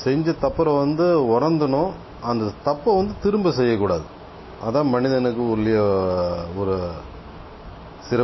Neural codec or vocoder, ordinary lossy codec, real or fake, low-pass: none; MP3, 24 kbps; real; 7.2 kHz